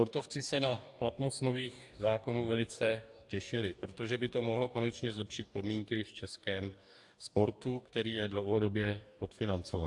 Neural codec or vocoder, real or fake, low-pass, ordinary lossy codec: codec, 44.1 kHz, 2.6 kbps, DAC; fake; 10.8 kHz; AAC, 64 kbps